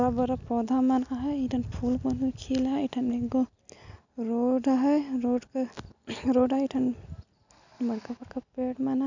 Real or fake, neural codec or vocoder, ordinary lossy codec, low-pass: real; none; none; 7.2 kHz